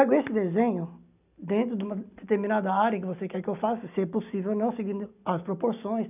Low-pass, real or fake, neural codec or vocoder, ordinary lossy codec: 3.6 kHz; real; none; none